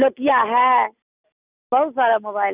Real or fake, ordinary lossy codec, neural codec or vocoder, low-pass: real; none; none; 3.6 kHz